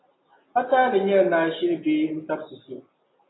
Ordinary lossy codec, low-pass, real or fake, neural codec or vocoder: AAC, 16 kbps; 7.2 kHz; real; none